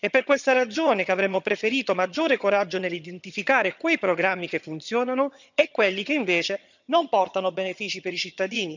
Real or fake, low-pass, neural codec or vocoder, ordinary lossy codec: fake; 7.2 kHz; vocoder, 22.05 kHz, 80 mel bands, HiFi-GAN; none